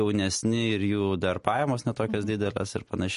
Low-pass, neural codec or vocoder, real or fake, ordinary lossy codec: 14.4 kHz; none; real; MP3, 48 kbps